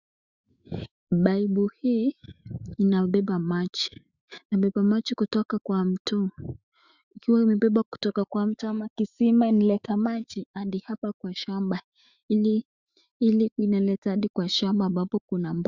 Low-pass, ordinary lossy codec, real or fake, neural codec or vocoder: 7.2 kHz; Opus, 64 kbps; fake; autoencoder, 48 kHz, 128 numbers a frame, DAC-VAE, trained on Japanese speech